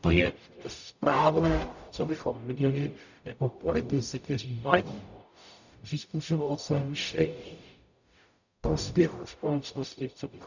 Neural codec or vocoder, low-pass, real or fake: codec, 44.1 kHz, 0.9 kbps, DAC; 7.2 kHz; fake